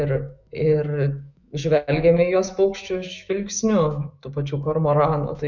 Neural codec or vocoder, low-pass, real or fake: autoencoder, 48 kHz, 128 numbers a frame, DAC-VAE, trained on Japanese speech; 7.2 kHz; fake